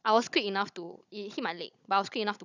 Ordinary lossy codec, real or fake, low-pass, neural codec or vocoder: none; fake; 7.2 kHz; codec, 16 kHz, 16 kbps, FunCodec, trained on Chinese and English, 50 frames a second